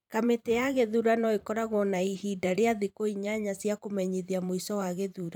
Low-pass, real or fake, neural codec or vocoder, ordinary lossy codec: 19.8 kHz; fake; vocoder, 44.1 kHz, 128 mel bands every 512 samples, BigVGAN v2; none